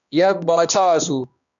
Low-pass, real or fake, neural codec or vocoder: 7.2 kHz; fake; codec, 16 kHz, 1 kbps, X-Codec, HuBERT features, trained on balanced general audio